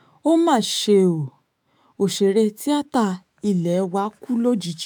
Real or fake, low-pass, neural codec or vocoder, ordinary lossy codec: fake; none; autoencoder, 48 kHz, 128 numbers a frame, DAC-VAE, trained on Japanese speech; none